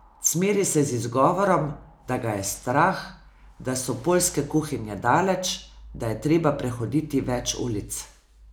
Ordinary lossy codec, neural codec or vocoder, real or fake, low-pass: none; none; real; none